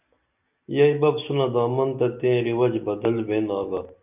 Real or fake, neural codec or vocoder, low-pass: real; none; 3.6 kHz